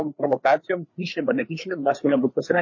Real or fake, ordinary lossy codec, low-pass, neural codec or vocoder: fake; MP3, 32 kbps; 7.2 kHz; codec, 16 kHz, 4 kbps, FreqCodec, larger model